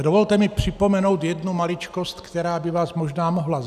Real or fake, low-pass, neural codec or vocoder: real; 14.4 kHz; none